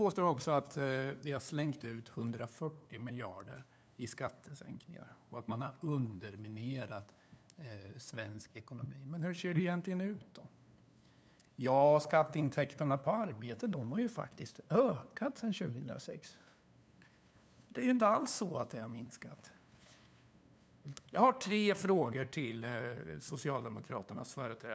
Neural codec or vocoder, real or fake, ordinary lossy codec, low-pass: codec, 16 kHz, 2 kbps, FunCodec, trained on LibriTTS, 25 frames a second; fake; none; none